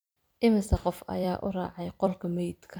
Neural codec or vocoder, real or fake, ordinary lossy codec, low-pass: vocoder, 44.1 kHz, 128 mel bands every 256 samples, BigVGAN v2; fake; none; none